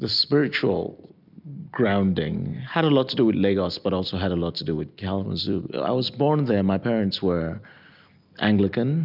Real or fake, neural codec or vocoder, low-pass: real; none; 5.4 kHz